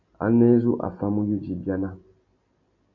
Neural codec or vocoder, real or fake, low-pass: none; real; 7.2 kHz